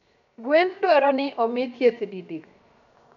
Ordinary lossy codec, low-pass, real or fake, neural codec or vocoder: none; 7.2 kHz; fake; codec, 16 kHz, 0.7 kbps, FocalCodec